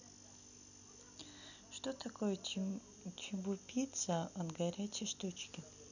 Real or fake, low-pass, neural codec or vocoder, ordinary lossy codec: real; 7.2 kHz; none; none